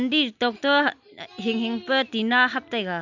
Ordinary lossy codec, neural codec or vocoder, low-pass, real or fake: none; none; 7.2 kHz; real